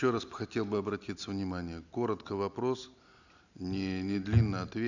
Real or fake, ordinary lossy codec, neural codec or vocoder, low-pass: real; none; none; 7.2 kHz